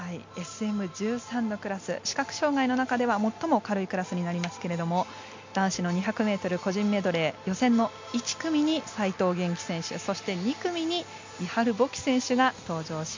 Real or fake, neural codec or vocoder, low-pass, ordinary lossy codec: real; none; 7.2 kHz; MP3, 48 kbps